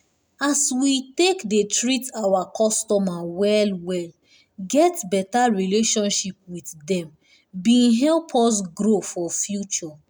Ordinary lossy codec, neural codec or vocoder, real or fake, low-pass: none; none; real; none